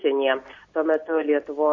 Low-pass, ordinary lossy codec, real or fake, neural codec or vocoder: 7.2 kHz; MP3, 32 kbps; real; none